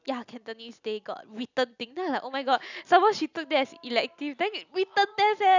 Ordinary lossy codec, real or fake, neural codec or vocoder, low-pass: none; real; none; 7.2 kHz